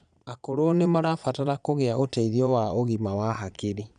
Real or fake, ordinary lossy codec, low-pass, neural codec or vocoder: fake; none; 9.9 kHz; vocoder, 22.05 kHz, 80 mel bands, Vocos